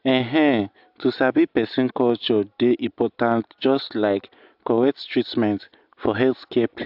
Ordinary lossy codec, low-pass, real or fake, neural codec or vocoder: AAC, 48 kbps; 5.4 kHz; real; none